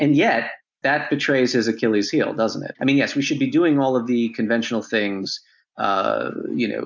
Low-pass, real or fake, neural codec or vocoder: 7.2 kHz; real; none